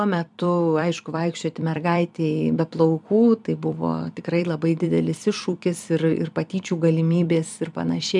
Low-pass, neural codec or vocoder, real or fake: 10.8 kHz; vocoder, 44.1 kHz, 128 mel bands every 256 samples, BigVGAN v2; fake